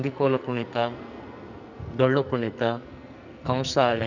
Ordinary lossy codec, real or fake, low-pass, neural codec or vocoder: none; fake; 7.2 kHz; codec, 44.1 kHz, 2.6 kbps, SNAC